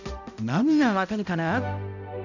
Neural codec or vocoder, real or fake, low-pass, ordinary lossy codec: codec, 16 kHz, 0.5 kbps, X-Codec, HuBERT features, trained on balanced general audio; fake; 7.2 kHz; none